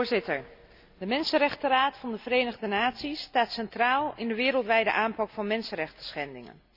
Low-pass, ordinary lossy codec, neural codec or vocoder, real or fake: 5.4 kHz; none; none; real